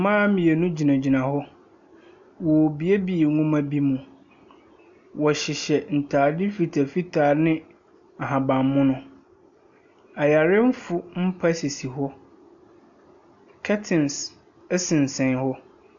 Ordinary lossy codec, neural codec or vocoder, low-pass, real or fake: AAC, 64 kbps; none; 7.2 kHz; real